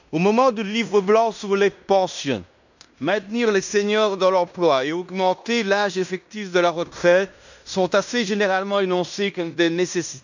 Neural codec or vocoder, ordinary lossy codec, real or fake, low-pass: codec, 16 kHz in and 24 kHz out, 0.9 kbps, LongCat-Audio-Codec, fine tuned four codebook decoder; none; fake; 7.2 kHz